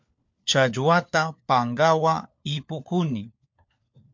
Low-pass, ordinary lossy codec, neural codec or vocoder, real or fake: 7.2 kHz; MP3, 48 kbps; codec, 16 kHz, 4 kbps, FunCodec, trained on LibriTTS, 50 frames a second; fake